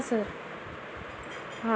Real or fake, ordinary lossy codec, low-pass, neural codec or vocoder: real; none; none; none